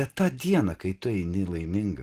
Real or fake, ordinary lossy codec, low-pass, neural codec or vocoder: real; Opus, 16 kbps; 14.4 kHz; none